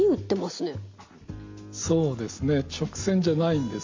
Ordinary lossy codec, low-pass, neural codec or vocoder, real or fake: MP3, 48 kbps; 7.2 kHz; none; real